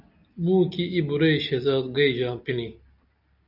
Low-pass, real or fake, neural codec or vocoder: 5.4 kHz; real; none